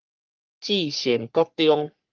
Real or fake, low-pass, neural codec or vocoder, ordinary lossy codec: fake; 7.2 kHz; codec, 44.1 kHz, 3.4 kbps, Pupu-Codec; Opus, 24 kbps